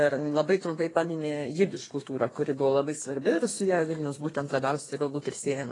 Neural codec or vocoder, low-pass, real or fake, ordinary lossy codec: codec, 32 kHz, 1.9 kbps, SNAC; 10.8 kHz; fake; AAC, 32 kbps